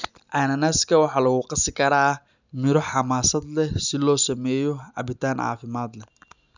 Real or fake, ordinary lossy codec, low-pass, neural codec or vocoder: real; none; 7.2 kHz; none